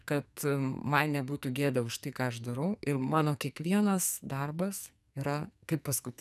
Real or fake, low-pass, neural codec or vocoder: fake; 14.4 kHz; codec, 44.1 kHz, 2.6 kbps, SNAC